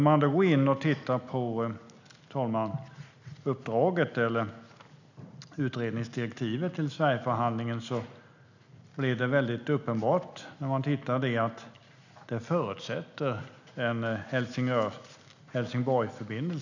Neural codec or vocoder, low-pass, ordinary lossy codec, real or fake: none; 7.2 kHz; none; real